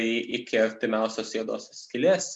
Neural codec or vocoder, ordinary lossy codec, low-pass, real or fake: none; MP3, 96 kbps; 10.8 kHz; real